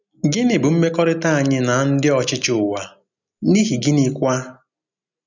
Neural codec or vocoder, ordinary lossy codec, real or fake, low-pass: none; none; real; 7.2 kHz